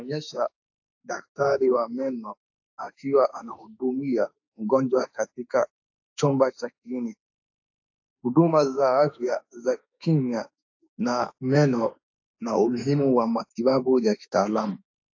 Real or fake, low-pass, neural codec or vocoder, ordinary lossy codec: fake; 7.2 kHz; autoencoder, 48 kHz, 32 numbers a frame, DAC-VAE, trained on Japanese speech; AAC, 48 kbps